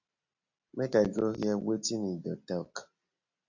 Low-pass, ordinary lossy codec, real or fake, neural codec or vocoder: 7.2 kHz; AAC, 48 kbps; real; none